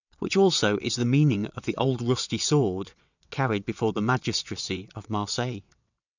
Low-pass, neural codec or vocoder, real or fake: 7.2 kHz; vocoder, 22.05 kHz, 80 mel bands, WaveNeXt; fake